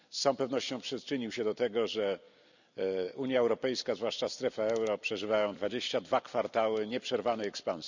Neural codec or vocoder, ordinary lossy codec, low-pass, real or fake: vocoder, 44.1 kHz, 128 mel bands every 256 samples, BigVGAN v2; none; 7.2 kHz; fake